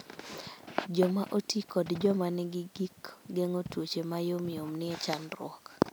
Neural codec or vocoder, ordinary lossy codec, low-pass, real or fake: none; none; none; real